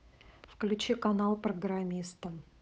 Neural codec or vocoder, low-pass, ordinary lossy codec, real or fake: codec, 16 kHz, 8 kbps, FunCodec, trained on Chinese and English, 25 frames a second; none; none; fake